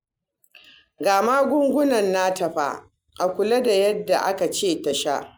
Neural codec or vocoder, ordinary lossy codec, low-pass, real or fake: none; none; none; real